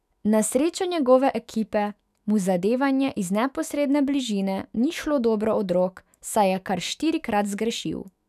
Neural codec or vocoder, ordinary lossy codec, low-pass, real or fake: autoencoder, 48 kHz, 128 numbers a frame, DAC-VAE, trained on Japanese speech; none; 14.4 kHz; fake